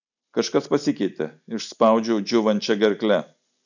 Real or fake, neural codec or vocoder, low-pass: real; none; 7.2 kHz